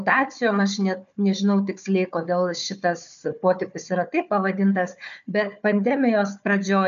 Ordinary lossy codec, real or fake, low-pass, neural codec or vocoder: AAC, 96 kbps; fake; 7.2 kHz; codec, 16 kHz, 4 kbps, FunCodec, trained on Chinese and English, 50 frames a second